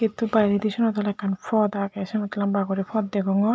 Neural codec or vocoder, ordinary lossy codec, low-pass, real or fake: none; none; none; real